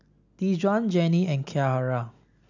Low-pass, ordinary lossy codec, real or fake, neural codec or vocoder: 7.2 kHz; none; real; none